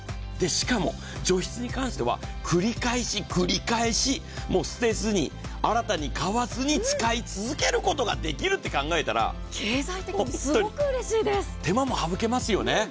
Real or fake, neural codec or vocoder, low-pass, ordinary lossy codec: real; none; none; none